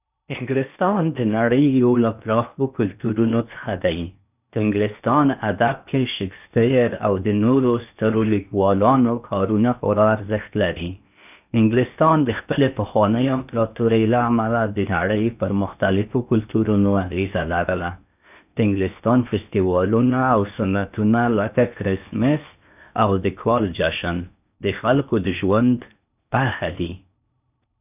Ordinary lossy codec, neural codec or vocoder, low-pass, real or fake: none; codec, 16 kHz in and 24 kHz out, 0.8 kbps, FocalCodec, streaming, 65536 codes; 3.6 kHz; fake